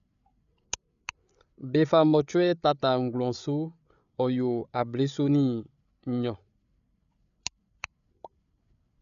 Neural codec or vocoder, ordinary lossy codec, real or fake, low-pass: codec, 16 kHz, 8 kbps, FreqCodec, larger model; none; fake; 7.2 kHz